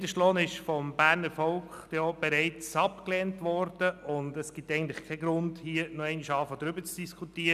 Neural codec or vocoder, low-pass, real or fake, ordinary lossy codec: none; 14.4 kHz; real; none